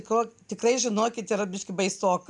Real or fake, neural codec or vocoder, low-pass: real; none; 10.8 kHz